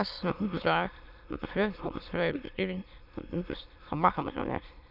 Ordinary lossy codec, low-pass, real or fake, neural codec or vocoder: none; 5.4 kHz; fake; autoencoder, 22.05 kHz, a latent of 192 numbers a frame, VITS, trained on many speakers